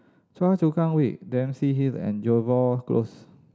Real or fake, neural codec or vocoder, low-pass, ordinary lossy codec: real; none; none; none